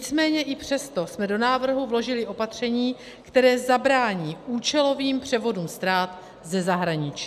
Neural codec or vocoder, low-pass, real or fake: none; 14.4 kHz; real